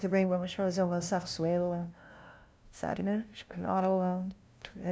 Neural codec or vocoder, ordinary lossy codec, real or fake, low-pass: codec, 16 kHz, 0.5 kbps, FunCodec, trained on LibriTTS, 25 frames a second; none; fake; none